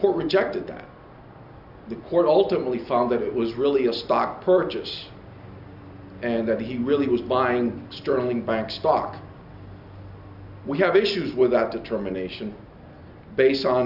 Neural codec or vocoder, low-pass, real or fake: none; 5.4 kHz; real